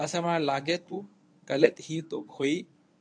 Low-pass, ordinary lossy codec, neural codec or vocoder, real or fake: 9.9 kHz; AAC, 64 kbps; codec, 24 kHz, 0.9 kbps, WavTokenizer, medium speech release version 1; fake